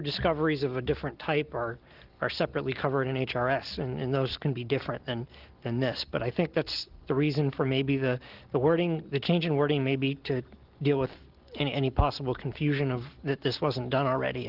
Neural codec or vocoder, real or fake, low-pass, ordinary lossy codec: none; real; 5.4 kHz; Opus, 16 kbps